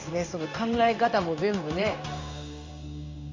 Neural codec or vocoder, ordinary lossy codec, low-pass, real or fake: codec, 16 kHz in and 24 kHz out, 1 kbps, XY-Tokenizer; MP3, 48 kbps; 7.2 kHz; fake